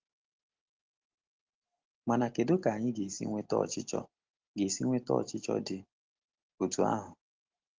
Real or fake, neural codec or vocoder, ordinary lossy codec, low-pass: real; none; Opus, 16 kbps; 7.2 kHz